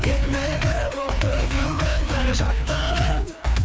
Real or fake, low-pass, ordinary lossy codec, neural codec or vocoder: fake; none; none; codec, 16 kHz, 2 kbps, FreqCodec, larger model